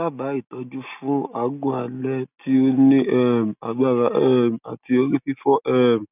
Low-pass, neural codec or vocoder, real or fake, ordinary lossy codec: 3.6 kHz; none; real; none